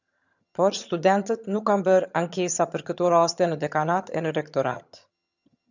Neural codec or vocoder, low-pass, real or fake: vocoder, 22.05 kHz, 80 mel bands, HiFi-GAN; 7.2 kHz; fake